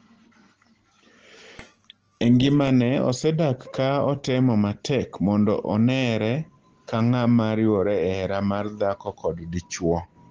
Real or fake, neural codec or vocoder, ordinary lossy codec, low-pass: real; none; Opus, 16 kbps; 7.2 kHz